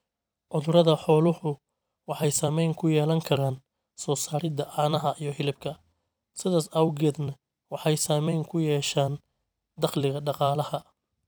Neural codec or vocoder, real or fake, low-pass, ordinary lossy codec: vocoder, 44.1 kHz, 128 mel bands every 256 samples, BigVGAN v2; fake; none; none